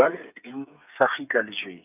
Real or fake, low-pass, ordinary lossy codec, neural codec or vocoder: fake; 3.6 kHz; none; codec, 16 kHz, 8 kbps, FreqCodec, smaller model